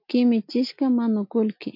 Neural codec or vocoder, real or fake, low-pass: none; real; 5.4 kHz